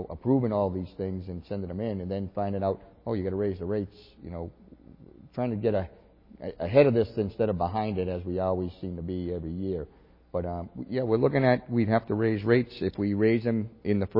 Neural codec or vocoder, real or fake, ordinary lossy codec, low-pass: none; real; MP3, 24 kbps; 5.4 kHz